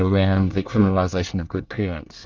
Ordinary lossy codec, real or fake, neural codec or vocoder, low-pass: Opus, 32 kbps; fake; codec, 24 kHz, 1 kbps, SNAC; 7.2 kHz